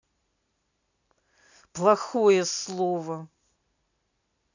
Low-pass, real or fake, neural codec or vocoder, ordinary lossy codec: 7.2 kHz; real; none; none